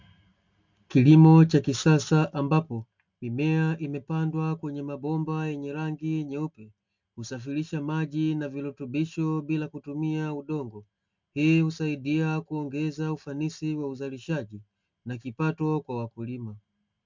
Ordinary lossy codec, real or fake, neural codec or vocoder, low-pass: MP3, 64 kbps; real; none; 7.2 kHz